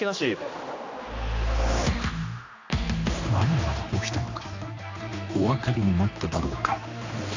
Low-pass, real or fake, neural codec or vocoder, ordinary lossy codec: 7.2 kHz; fake; codec, 16 kHz, 2 kbps, X-Codec, HuBERT features, trained on general audio; AAC, 48 kbps